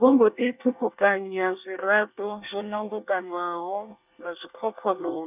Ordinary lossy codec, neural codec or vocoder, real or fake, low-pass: none; codec, 24 kHz, 1 kbps, SNAC; fake; 3.6 kHz